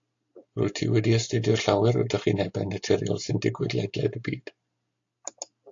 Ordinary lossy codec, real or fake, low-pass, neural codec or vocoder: AAC, 48 kbps; real; 7.2 kHz; none